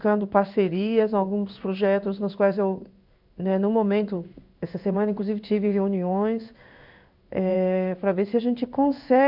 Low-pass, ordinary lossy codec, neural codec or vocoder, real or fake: 5.4 kHz; none; codec, 16 kHz in and 24 kHz out, 1 kbps, XY-Tokenizer; fake